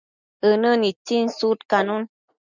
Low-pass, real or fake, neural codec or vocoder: 7.2 kHz; real; none